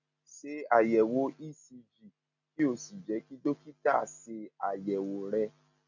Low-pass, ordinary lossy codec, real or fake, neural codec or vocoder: 7.2 kHz; none; real; none